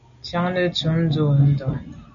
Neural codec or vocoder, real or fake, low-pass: none; real; 7.2 kHz